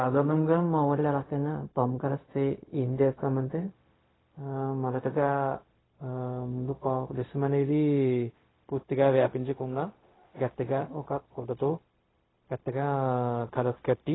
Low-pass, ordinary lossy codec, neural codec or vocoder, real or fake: 7.2 kHz; AAC, 16 kbps; codec, 16 kHz, 0.4 kbps, LongCat-Audio-Codec; fake